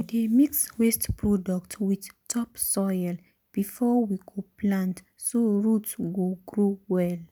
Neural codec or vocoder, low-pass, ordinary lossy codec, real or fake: none; none; none; real